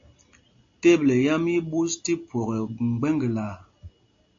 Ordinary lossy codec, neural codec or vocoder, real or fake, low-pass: AAC, 48 kbps; none; real; 7.2 kHz